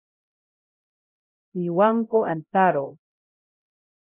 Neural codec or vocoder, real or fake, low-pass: codec, 16 kHz, 0.5 kbps, X-Codec, HuBERT features, trained on LibriSpeech; fake; 3.6 kHz